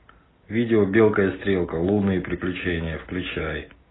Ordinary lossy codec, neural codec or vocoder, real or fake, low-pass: AAC, 16 kbps; none; real; 7.2 kHz